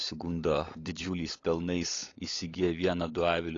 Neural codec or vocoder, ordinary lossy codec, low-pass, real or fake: codec, 16 kHz, 16 kbps, FunCodec, trained on LibriTTS, 50 frames a second; AAC, 32 kbps; 7.2 kHz; fake